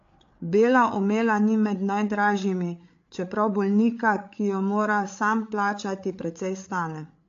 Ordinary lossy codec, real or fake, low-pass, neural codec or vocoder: AAC, 48 kbps; fake; 7.2 kHz; codec, 16 kHz, 8 kbps, FreqCodec, larger model